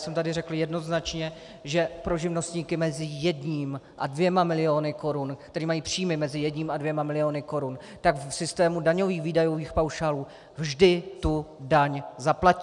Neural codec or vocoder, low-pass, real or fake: none; 10.8 kHz; real